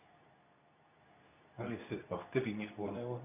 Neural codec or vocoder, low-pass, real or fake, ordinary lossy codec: codec, 24 kHz, 0.9 kbps, WavTokenizer, medium speech release version 2; 3.6 kHz; fake; none